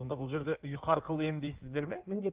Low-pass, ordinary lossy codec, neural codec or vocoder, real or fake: 3.6 kHz; Opus, 32 kbps; codec, 16 kHz in and 24 kHz out, 1.1 kbps, FireRedTTS-2 codec; fake